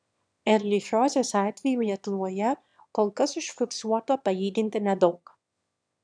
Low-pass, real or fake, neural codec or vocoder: 9.9 kHz; fake; autoencoder, 22.05 kHz, a latent of 192 numbers a frame, VITS, trained on one speaker